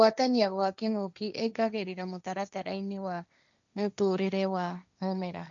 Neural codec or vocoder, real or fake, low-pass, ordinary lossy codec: codec, 16 kHz, 1.1 kbps, Voila-Tokenizer; fake; 7.2 kHz; none